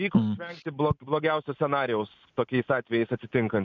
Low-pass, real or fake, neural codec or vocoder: 7.2 kHz; real; none